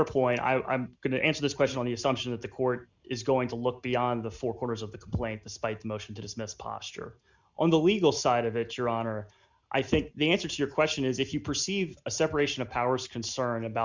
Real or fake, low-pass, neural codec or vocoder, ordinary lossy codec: real; 7.2 kHz; none; Opus, 64 kbps